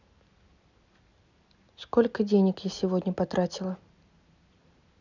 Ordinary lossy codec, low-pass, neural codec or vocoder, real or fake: none; 7.2 kHz; none; real